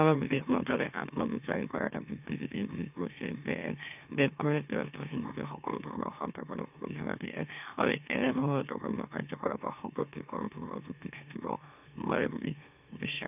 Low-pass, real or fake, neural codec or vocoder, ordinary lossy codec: 3.6 kHz; fake; autoencoder, 44.1 kHz, a latent of 192 numbers a frame, MeloTTS; none